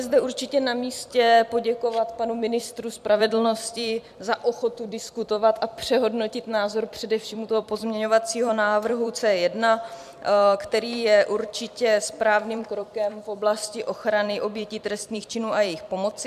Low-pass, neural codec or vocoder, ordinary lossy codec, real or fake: 14.4 kHz; vocoder, 44.1 kHz, 128 mel bands every 256 samples, BigVGAN v2; MP3, 96 kbps; fake